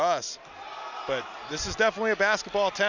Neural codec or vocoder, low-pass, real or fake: none; 7.2 kHz; real